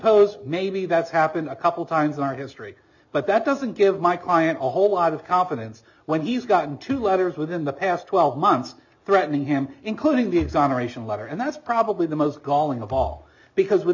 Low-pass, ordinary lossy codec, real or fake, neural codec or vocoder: 7.2 kHz; MP3, 64 kbps; real; none